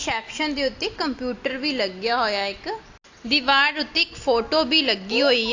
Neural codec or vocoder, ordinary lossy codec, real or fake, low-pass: none; AAC, 48 kbps; real; 7.2 kHz